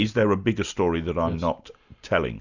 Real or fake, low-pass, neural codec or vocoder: real; 7.2 kHz; none